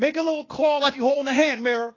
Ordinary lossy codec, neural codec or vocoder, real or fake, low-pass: AAC, 32 kbps; codec, 16 kHz, 0.8 kbps, ZipCodec; fake; 7.2 kHz